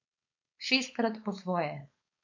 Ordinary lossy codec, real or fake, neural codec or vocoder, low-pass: MP3, 64 kbps; fake; codec, 16 kHz, 4.8 kbps, FACodec; 7.2 kHz